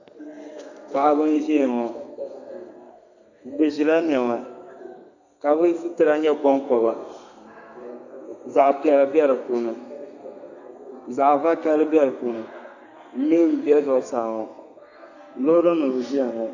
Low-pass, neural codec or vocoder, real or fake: 7.2 kHz; codec, 32 kHz, 1.9 kbps, SNAC; fake